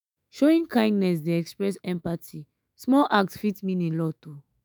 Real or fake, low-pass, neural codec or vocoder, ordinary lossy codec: fake; none; autoencoder, 48 kHz, 128 numbers a frame, DAC-VAE, trained on Japanese speech; none